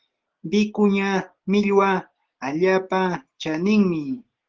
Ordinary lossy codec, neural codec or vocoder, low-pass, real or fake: Opus, 16 kbps; none; 7.2 kHz; real